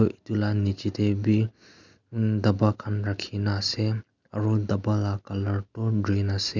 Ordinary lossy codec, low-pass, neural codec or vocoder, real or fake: none; 7.2 kHz; none; real